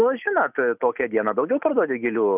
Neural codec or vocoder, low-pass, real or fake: none; 3.6 kHz; real